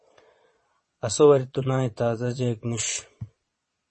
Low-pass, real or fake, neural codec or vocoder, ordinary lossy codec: 9.9 kHz; fake; vocoder, 22.05 kHz, 80 mel bands, Vocos; MP3, 32 kbps